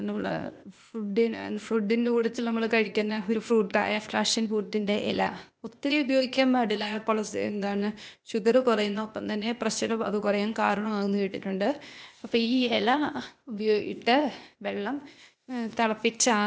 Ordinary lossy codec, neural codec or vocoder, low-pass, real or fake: none; codec, 16 kHz, 0.8 kbps, ZipCodec; none; fake